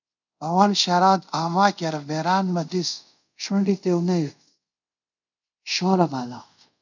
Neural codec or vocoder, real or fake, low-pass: codec, 24 kHz, 0.5 kbps, DualCodec; fake; 7.2 kHz